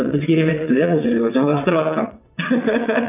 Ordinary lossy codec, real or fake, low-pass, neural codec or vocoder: none; fake; 3.6 kHz; vocoder, 22.05 kHz, 80 mel bands, WaveNeXt